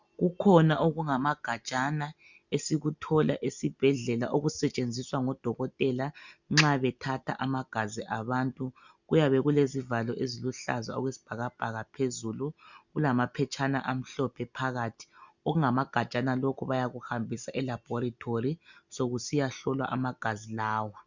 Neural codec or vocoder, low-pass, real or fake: none; 7.2 kHz; real